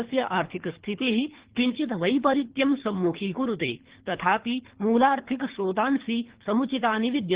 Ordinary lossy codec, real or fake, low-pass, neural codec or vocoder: Opus, 16 kbps; fake; 3.6 kHz; codec, 24 kHz, 3 kbps, HILCodec